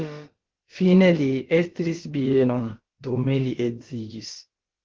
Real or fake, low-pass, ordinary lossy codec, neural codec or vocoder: fake; 7.2 kHz; Opus, 16 kbps; codec, 16 kHz, about 1 kbps, DyCAST, with the encoder's durations